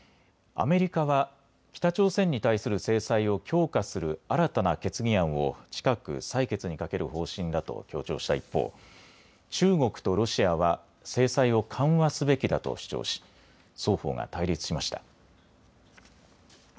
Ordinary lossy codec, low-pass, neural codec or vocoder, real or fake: none; none; none; real